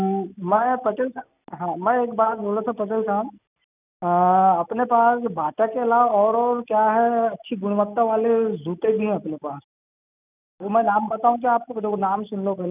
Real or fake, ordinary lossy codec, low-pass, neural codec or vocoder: real; none; 3.6 kHz; none